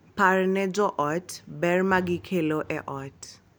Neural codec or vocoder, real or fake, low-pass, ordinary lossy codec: none; real; none; none